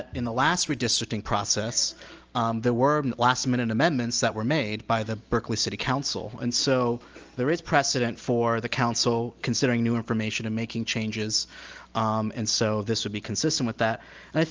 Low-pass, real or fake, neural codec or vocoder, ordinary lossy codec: 7.2 kHz; real; none; Opus, 24 kbps